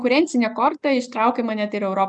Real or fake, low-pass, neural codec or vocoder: real; 10.8 kHz; none